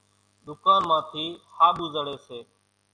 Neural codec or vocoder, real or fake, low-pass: none; real; 9.9 kHz